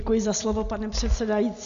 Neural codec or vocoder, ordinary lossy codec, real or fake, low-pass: none; MP3, 64 kbps; real; 7.2 kHz